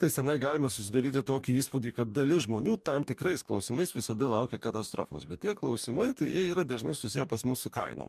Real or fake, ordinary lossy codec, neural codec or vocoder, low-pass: fake; MP3, 96 kbps; codec, 44.1 kHz, 2.6 kbps, DAC; 14.4 kHz